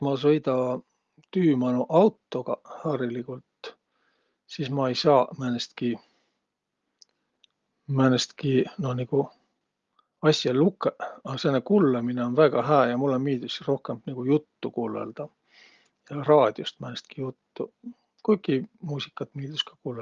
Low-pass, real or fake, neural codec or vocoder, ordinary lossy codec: 7.2 kHz; real; none; Opus, 32 kbps